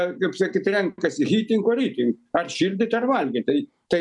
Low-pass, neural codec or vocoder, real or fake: 10.8 kHz; none; real